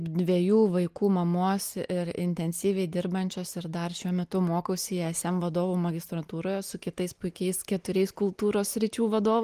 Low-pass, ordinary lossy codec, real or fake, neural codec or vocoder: 14.4 kHz; Opus, 24 kbps; real; none